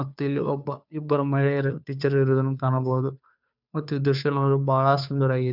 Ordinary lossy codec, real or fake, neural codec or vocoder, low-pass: none; fake; codec, 16 kHz, 2 kbps, FunCodec, trained on Chinese and English, 25 frames a second; 5.4 kHz